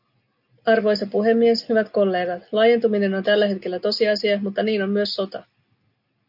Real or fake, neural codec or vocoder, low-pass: real; none; 5.4 kHz